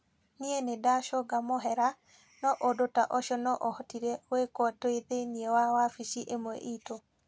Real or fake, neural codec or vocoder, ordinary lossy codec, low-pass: real; none; none; none